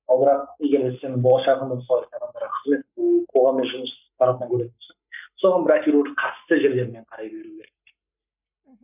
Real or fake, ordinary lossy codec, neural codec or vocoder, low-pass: fake; none; codec, 44.1 kHz, 7.8 kbps, Pupu-Codec; 3.6 kHz